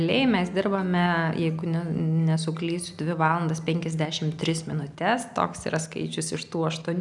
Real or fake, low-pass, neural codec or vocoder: real; 10.8 kHz; none